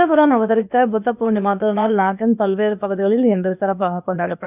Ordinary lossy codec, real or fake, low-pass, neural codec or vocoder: none; fake; 3.6 kHz; codec, 16 kHz, 0.8 kbps, ZipCodec